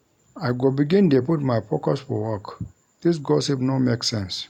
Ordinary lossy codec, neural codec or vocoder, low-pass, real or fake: none; none; 19.8 kHz; real